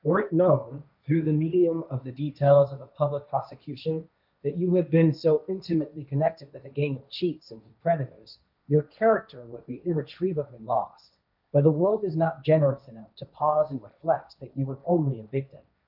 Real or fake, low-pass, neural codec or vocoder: fake; 5.4 kHz; codec, 16 kHz, 1.1 kbps, Voila-Tokenizer